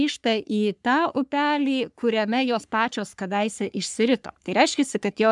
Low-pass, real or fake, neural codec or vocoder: 10.8 kHz; fake; codec, 44.1 kHz, 3.4 kbps, Pupu-Codec